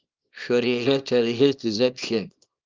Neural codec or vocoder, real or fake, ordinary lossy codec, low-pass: codec, 24 kHz, 0.9 kbps, WavTokenizer, small release; fake; Opus, 32 kbps; 7.2 kHz